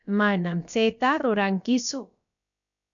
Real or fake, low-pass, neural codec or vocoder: fake; 7.2 kHz; codec, 16 kHz, about 1 kbps, DyCAST, with the encoder's durations